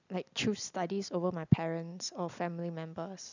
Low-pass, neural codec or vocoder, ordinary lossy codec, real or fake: 7.2 kHz; none; none; real